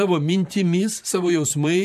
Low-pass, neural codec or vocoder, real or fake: 14.4 kHz; vocoder, 44.1 kHz, 128 mel bands, Pupu-Vocoder; fake